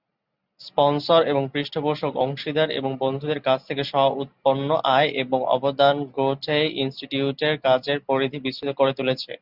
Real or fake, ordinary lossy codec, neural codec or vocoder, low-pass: real; Opus, 64 kbps; none; 5.4 kHz